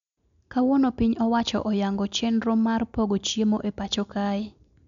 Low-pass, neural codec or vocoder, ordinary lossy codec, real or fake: 7.2 kHz; none; none; real